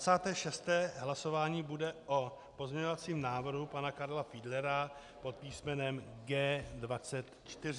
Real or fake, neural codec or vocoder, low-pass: real; none; 10.8 kHz